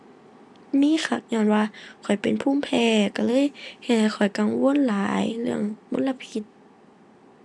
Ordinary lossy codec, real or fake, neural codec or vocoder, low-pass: none; real; none; none